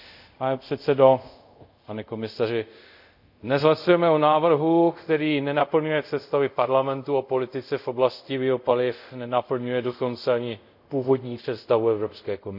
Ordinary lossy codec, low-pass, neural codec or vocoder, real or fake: none; 5.4 kHz; codec, 24 kHz, 0.5 kbps, DualCodec; fake